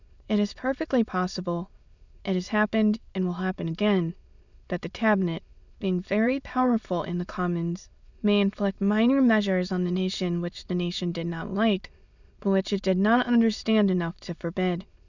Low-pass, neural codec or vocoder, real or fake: 7.2 kHz; autoencoder, 22.05 kHz, a latent of 192 numbers a frame, VITS, trained on many speakers; fake